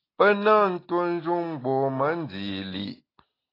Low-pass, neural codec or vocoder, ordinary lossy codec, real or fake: 5.4 kHz; none; AAC, 24 kbps; real